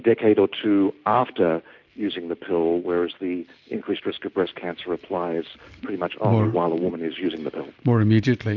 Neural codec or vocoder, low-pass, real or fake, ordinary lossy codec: none; 7.2 kHz; real; MP3, 48 kbps